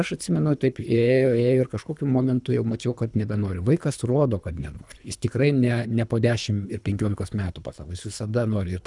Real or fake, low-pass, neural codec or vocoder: fake; 10.8 kHz; codec, 24 kHz, 3 kbps, HILCodec